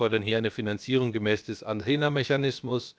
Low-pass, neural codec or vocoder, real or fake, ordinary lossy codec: none; codec, 16 kHz, about 1 kbps, DyCAST, with the encoder's durations; fake; none